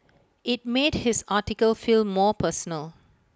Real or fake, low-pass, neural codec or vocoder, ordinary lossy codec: real; none; none; none